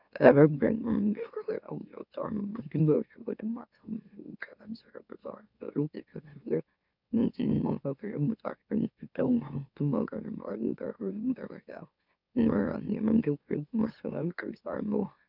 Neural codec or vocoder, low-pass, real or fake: autoencoder, 44.1 kHz, a latent of 192 numbers a frame, MeloTTS; 5.4 kHz; fake